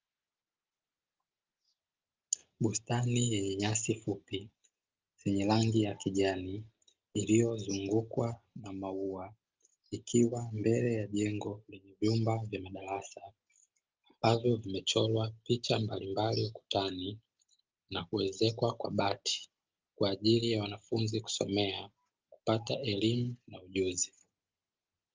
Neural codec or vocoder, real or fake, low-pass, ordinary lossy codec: none; real; 7.2 kHz; Opus, 24 kbps